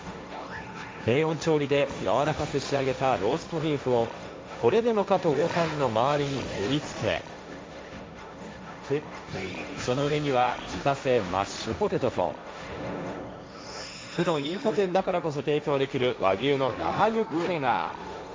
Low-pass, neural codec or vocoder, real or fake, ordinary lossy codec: none; codec, 16 kHz, 1.1 kbps, Voila-Tokenizer; fake; none